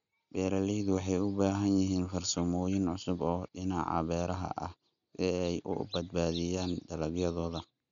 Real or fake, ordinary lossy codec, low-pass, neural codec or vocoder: real; none; 7.2 kHz; none